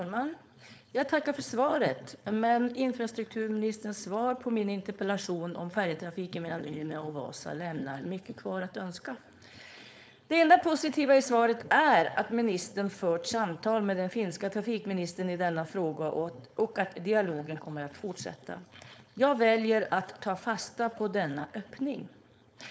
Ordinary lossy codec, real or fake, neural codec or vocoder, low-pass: none; fake; codec, 16 kHz, 4.8 kbps, FACodec; none